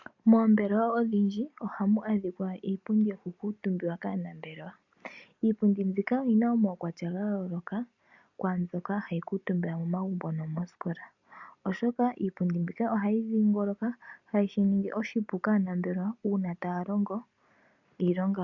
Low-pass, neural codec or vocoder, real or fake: 7.2 kHz; none; real